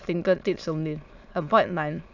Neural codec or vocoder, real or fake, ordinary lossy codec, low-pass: autoencoder, 22.05 kHz, a latent of 192 numbers a frame, VITS, trained on many speakers; fake; none; 7.2 kHz